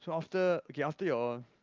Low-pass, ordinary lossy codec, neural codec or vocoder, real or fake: 7.2 kHz; Opus, 32 kbps; none; real